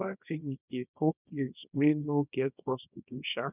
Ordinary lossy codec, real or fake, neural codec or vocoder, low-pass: none; fake; codec, 24 kHz, 0.9 kbps, WavTokenizer, small release; 3.6 kHz